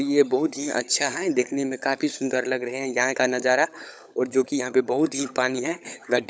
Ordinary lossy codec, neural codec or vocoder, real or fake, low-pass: none; codec, 16 kHz, 16 kbps, FunCodec, trained on LibriTTS, 50 frames a second; fake; none